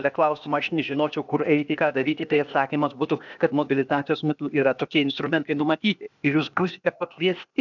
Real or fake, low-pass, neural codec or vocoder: fake; 7.2 kHz; codec, 16 kHz, 0.8 kbps, ZipCodec